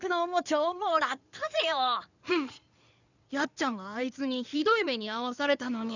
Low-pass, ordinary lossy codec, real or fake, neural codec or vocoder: 7.2 kHz; none; fake; codec, 16 kHz in and 24 kHz out, 2.2 kbps, FireRedTTS-2 codec